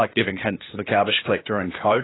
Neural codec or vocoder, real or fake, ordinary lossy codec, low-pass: codec, 16 kHz, 0.8 kbps, ZipCodec; fake; AAC, 16 kbps; 7.2 kHz